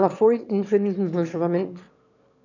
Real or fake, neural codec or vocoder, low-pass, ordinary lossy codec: fake; autoencoder, 22.05 kHz, a latent of 192 numbers a frame, VITS, trained on one speaker; 7.2 kHz; none